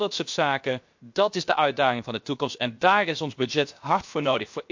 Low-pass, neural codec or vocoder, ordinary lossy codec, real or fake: 7.2 kHz; codec, 16 kHz, 0.7 kbps, FocalCodec; MP3, 48 kbps; fake